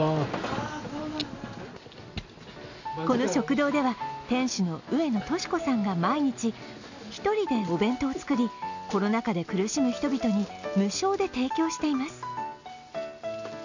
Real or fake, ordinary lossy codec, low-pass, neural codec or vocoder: real; none; 7.2 kHz; none